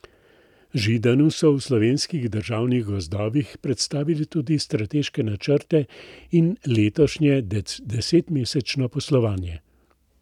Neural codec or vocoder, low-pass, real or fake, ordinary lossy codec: none; 19.8 kHz; real; none